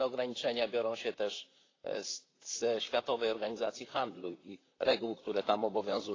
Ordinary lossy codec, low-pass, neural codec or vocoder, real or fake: AAC, 32 kbps; 7.2 kHz; vocoder, 44.1 kHz, 128 mel bands, Pupu-Vocoder; fake